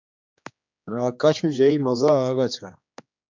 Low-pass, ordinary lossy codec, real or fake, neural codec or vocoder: 7.2 kHz; MP3, 64 kbps; fake; codec, 16 kHz, 2 kbps, X-Codec, HuBERT features, trained on general audio